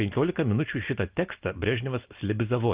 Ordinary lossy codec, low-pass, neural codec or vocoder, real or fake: Opus, 24 kbps; 3.6 kHz; none; real